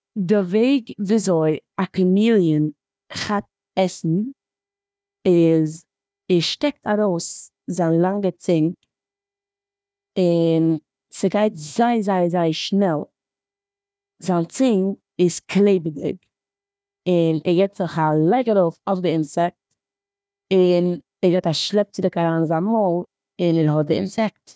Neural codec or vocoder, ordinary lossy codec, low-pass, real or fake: codec, 16 kHz, 1 kbps, FunCodec, trained on Chinese and English, 50 frames a second; none; none; fake